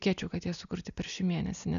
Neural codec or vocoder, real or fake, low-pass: none; real; 7.2 kHz